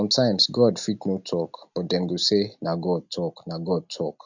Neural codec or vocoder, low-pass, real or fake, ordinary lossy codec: none; 7.2 kHz; real; none